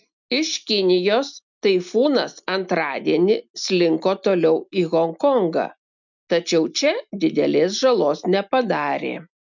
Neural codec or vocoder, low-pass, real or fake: none; 7.2 kHz; real